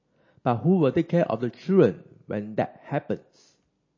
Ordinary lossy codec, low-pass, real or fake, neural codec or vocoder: MP3, 32 kbps; 7.2 kHz; real; none